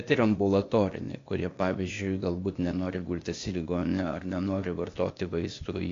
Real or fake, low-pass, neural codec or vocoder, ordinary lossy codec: fake; 7.2 kHz; codec, 16 kHz, 0.8 kbps, ZipCodec; AAC, 48 kbps